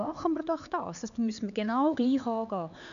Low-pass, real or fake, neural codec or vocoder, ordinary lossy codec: 7.2 kHz; fake; codec, 16 kHz, 4 kbps, X-Codec, HuBERT features, trained on LibriSpeech; none